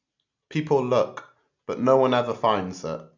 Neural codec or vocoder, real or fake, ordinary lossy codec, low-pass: none; real; none; 7.2 kHz